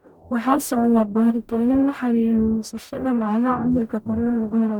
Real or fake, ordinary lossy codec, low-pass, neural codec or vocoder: fake; none; 19.8 kHz; codec, 44.1 kHz, 0.9 kbps, DAC